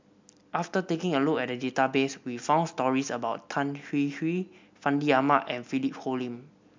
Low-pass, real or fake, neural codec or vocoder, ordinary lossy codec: 7.2 kHz; real; none; MP3, 64 kbps